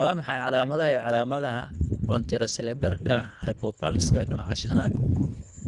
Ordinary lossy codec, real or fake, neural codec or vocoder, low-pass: Opus, 64 kbps; fake; codec, 24 kHz, 1.5 kbps, HILCodec; 10.8 kHz